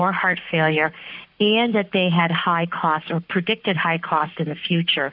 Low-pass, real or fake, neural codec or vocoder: 5.4 kHz; fake; codec, 44.1 kHz, 7.8 kbps, Pupu-Codec